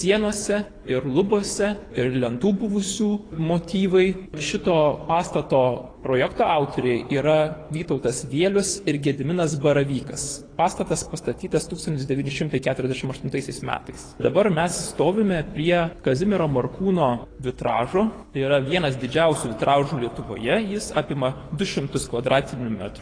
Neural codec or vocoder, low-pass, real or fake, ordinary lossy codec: codec, 24 kHz, 6 kbps, HILCodec; 9.9 kHz; fake; AAC, 32 kbps